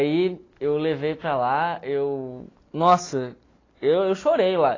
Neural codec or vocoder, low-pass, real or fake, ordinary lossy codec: none; 7.2 kHz; real; AAC, 32 kbps